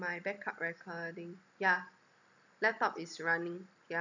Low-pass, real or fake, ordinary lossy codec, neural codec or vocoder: 7.2 kHz; real; none; none